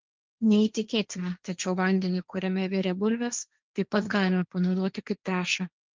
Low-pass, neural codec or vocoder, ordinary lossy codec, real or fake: 7.2 kHz; codec, 16 kHz, 1.1 kbps, Voila-Tokenizer; Opus, 32 kbps; fake